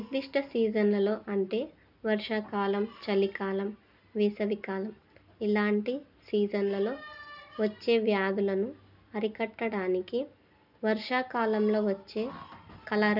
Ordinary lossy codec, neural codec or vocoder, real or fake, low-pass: none; none; real; 5.4 kHz